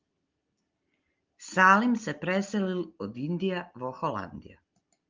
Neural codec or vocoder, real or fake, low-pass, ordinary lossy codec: none; real; 7.2 kHz; Opus, 24 kbps